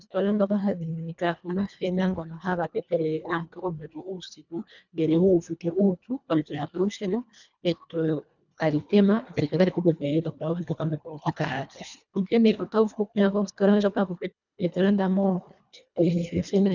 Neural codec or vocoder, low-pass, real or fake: codec, 24 kHz, 1.5 kbps, HILCodec; 7.2 kHz; fake